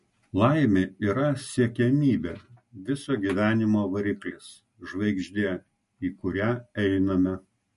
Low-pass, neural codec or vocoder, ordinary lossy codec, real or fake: 14.4 kHz; none; MP3, 48 kbps; real